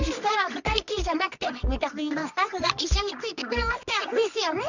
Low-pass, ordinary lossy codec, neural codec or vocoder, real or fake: 7.2 kHz; none; codec, 24 kHz, 0.9 kbps, WavTokenizer, medium music audio release; fake